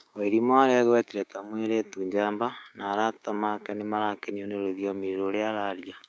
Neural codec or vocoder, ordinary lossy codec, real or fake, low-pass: codec, 16 kHz, 6 kbps, DAC; none; fake; none